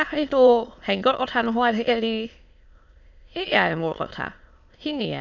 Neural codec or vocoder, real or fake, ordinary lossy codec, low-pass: autoencoder, 22.05 kHz, a latent of 192 numbers a frame, VITS, trained on many speakers; fake; none; 7.2 kHz